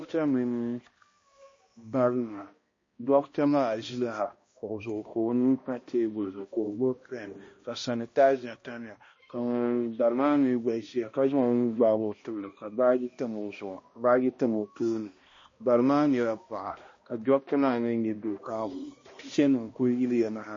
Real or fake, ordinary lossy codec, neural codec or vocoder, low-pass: fake; MP3, 32 kbps; codec, 16 kHz, 1 kbps, X-Codec, HuBERT features, trained on balanced general audio; 7.2 kHz